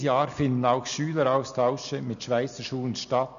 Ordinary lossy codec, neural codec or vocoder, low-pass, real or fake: none; none; 7.2 kHz; real